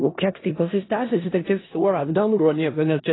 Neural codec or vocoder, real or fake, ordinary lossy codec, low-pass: codec, 16 kHz in and 24 kHz out, 0.4 kbps, LongCat-Audio-Codec, four codebook decoder; fake; AAC, 16 kbps; 7.2 kHz